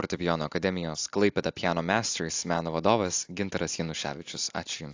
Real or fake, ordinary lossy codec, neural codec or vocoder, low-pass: real; AAC, 48 kbps; none; 7.2 kHz